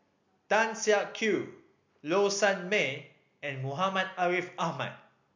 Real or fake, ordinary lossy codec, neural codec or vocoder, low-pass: real; MP3, 48 kbps; none; 7.2 kHz